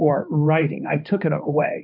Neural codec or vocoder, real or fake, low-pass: codec, 16 kHz, 2 kbps, X-Codec, HuBERT features, trained on balanced general audio; fake; 5.4 kHz